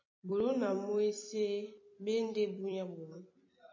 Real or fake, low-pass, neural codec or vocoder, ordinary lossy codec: fake; 7.2 kHz; vocoder, 44.1 kHz, 128 mel bands every 256 samples, BigVGAN v2; MP3, 32 kbps